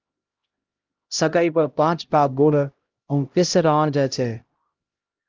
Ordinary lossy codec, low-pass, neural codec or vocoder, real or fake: Opus, 24 kbps; 7.2 kHz; codec, 16 kHz, 0.5 kbps, X-Codec, HuBERT features, trained on LibriSpeech; fake